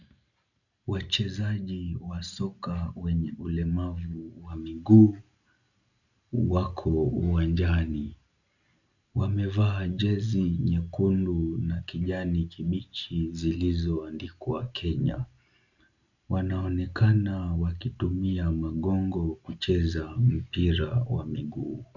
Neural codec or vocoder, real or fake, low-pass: none; real; 7.2 kHz